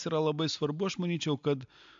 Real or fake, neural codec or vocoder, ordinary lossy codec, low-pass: real; none; AAC, 64 kbps; 7.2 kHz